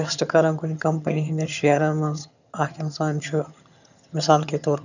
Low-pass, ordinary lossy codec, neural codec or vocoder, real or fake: 7.2 kHz; none; vocoder, 22.05 kHz, 80 mel bands, HiFi-GAN; fake